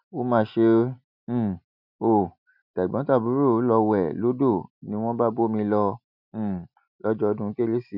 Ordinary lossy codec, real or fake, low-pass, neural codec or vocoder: none; real; 5.4 kHz; none